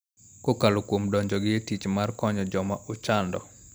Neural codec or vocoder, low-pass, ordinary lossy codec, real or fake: none; none; none; real